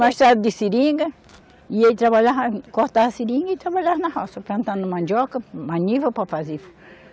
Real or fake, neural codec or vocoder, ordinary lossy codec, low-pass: real; none; none; none